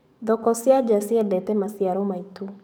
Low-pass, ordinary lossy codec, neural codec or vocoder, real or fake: none; none; codec, 44.1 kHz, 7.8 kbps, DAC; fake